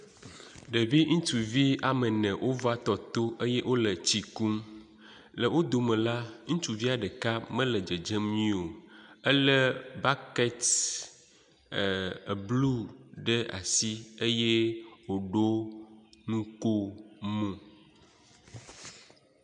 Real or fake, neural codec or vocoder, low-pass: real; none; 9.9 kHz